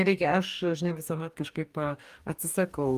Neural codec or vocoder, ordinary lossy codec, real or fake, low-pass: codec, 44.1 kHz, 2.6 kbps, DAC; Opus, 32 kbps; fake; 14.4 kHz